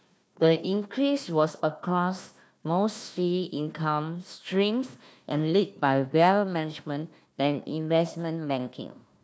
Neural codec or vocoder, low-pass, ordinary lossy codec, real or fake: codec, 16 kHz, 1 kbps, FunCodec, trained on Chinese and English, 50 frames a second; none; none; fake